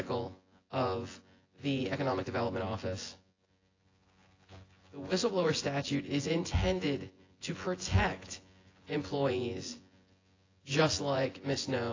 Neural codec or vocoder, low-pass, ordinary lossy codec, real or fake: vocoder, 24 kHz, 100 mel bands, Vocos; 7.2 kHz; AAC, 32 kbps; fake